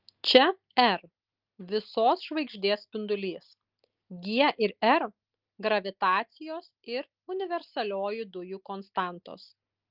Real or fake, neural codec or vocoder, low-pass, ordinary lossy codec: real; none; 5.4 kHz; Opus, 32 kbps